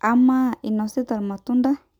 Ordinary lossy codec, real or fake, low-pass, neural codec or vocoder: none; real; 19.8 kHz; none